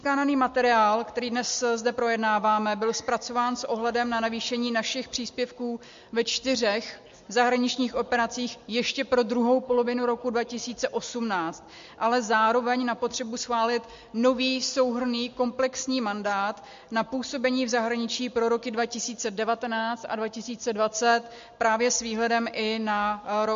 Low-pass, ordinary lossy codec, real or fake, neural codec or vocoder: 7.2 kHz; MP3, 48 kbps; real; none